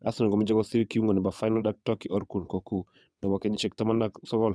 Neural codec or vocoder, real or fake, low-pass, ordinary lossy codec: vocoder, 22.05 kHz, 80 mel bands, WaveNeXt; fake; none; none